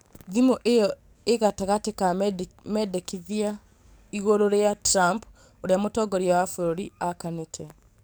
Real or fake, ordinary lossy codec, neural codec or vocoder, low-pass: fake; none; codec, 44.1 kHz, 7.8 kbps, DAC; none